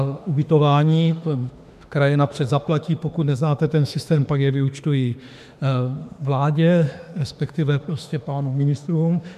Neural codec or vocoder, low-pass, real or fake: autoencoder, 48 kHz, 32 numbers a frame, DAC-VAE, trained on Japanese speech; 14.4 kHz; fake